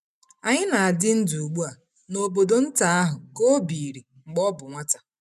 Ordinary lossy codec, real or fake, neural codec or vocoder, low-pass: none; real; none; 14.4 kHz